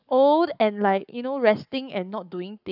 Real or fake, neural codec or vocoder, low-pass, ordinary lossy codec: fake; codec, 44.1 kHz, 7.8 kbps, Pupu-Codec; 5.4 kHz; none